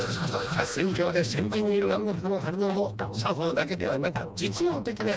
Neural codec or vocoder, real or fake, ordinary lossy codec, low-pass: codec, 16 kHz, 1 kbps, FreqCodec, smaller model; fake; none; none